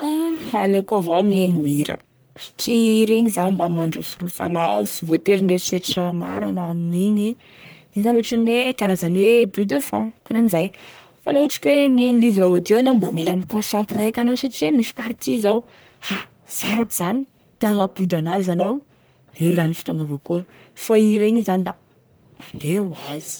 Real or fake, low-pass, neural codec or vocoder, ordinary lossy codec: fake; none; codec, 44.1 kHz, 1.7 kbps, Pupu-Codec; none